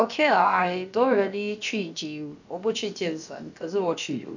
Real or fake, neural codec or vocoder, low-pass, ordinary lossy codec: fake; codec, 16 kHz, about 1 kbps, DyCAST, with the encoder's durations; 7.2 kHz; none